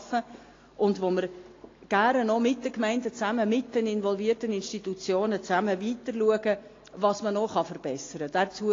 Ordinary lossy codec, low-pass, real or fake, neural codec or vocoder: AAC, 32 kbps; 7.2 kHz; real; none